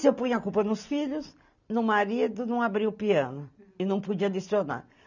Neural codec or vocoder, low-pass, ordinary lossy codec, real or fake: none; 7.2 kHz; none; real